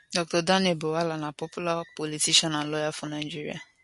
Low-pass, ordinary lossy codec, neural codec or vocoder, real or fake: 14.4 kHz; MP3, 48 kbps; none; real